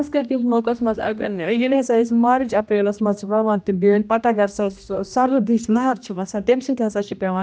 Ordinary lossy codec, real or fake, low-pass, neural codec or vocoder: none; fake; none; codec, 16 kHz, 1 kbps, X-Codec, HuBERT features, trained on general audio